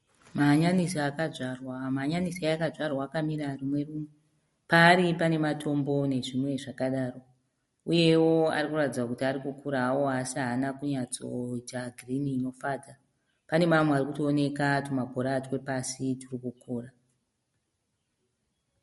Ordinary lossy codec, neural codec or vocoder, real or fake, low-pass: MP3, 48 kbps; none; real; 19.8 kHz